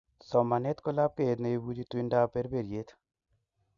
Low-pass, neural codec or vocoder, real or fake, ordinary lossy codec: 7.2 kHz; none; real; Opus, 64 kbps